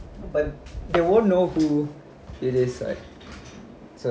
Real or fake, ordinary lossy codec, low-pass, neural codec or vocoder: real; none; none; none